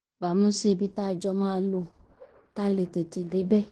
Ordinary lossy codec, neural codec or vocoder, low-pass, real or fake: Opus, 16 kbps; codec, 16 kHz in and 24 kHz out, 0.9 kbps, LongCat-Audio-Codec, fine tuned four codebook decoder; 10.8 kHz; fake